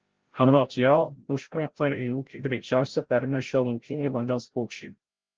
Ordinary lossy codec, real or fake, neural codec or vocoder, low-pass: Opus, 16 kbps; fake; codec, 16 kHz, 0.5 kbps, FreqCodec, larger model; 7.2 kHz